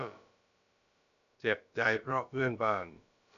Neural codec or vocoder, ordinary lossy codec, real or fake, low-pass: codec, 16 kHz, about 1 kbps, DyCAST, with the encoder's durations; none; fake; 7.2 kHz